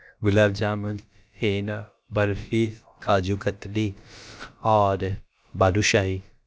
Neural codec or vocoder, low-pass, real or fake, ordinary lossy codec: codec, 16 kHz, about 1 kbps, DyCAST, with the encoder's durations; none; fake; none